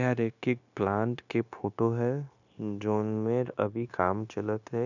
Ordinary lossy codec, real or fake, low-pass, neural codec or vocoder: none; fake; 7.2 kHz; codec, 16 kHz, 0.9 kbps, LongCat-Audio-Codec